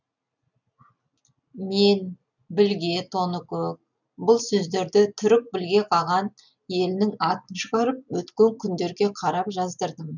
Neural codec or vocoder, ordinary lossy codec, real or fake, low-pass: none; none; real; 7.2 kHz